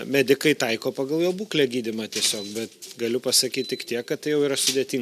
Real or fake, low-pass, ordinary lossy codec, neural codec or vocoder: real; 14.4 kHz; MP3, 96 kbps; none